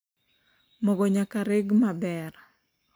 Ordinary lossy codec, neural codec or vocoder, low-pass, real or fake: none; none; none; real